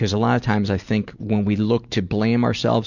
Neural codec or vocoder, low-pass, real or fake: none; 7.2 kHz; real